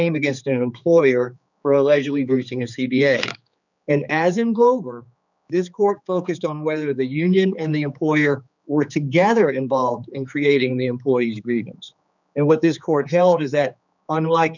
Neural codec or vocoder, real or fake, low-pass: codec, 16 kHz, 4 kbps, X-Codec, HuBERT features, trained on general audio; fake; 7.2 kHz